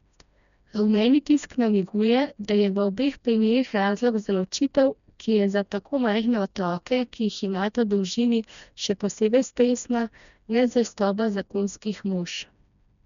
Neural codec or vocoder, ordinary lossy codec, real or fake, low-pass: codec, 16 kHz, 1 kbps, FreqCodec, smaller model; none; fake; 7.2 kHz